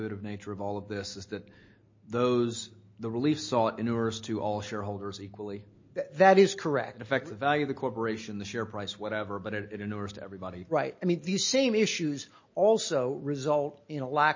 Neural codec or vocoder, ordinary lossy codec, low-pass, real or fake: none; MP3, 32 kbps; 7.2 kHz; real